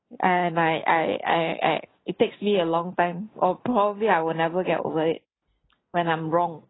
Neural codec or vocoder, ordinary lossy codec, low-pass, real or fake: none; AAC, 16 kbps; 7.2 kHz; real